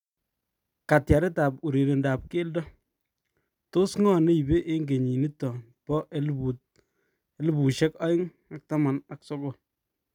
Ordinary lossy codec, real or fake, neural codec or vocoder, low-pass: none; real; none; 19.8 kHz